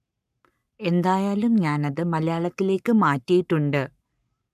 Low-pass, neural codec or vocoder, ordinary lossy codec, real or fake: 14.4 kHz; codec, 44.1 kHz, 7.8 kbps, Pupu-Codec; none; fake